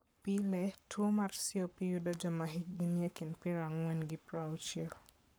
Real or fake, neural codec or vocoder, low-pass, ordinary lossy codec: fake; codec, 44.1 kHz, 7.8 kbps, Pupu-Codec; none; none